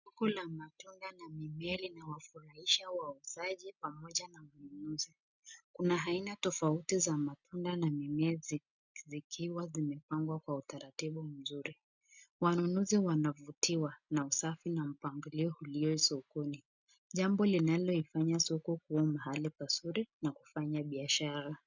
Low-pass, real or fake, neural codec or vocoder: 7.2 kHz; real; none